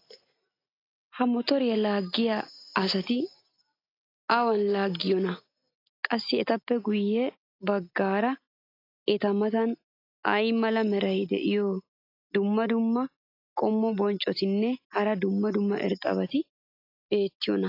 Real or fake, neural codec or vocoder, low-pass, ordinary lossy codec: real; none; 5.4 kHz; AAC, 32 kbps